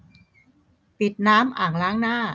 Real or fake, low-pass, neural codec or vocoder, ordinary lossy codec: real; none; none; none